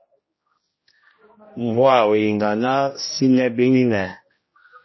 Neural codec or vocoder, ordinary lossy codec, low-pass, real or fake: codec, 16 kHz, 1 kbps, X-Codec, HuBERT features, trained on general audio; MP3, 24 kbps; 7.2 kHz; fake